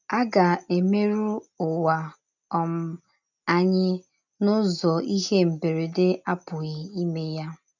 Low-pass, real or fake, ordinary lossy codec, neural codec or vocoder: 7.2 kHz; real; none; none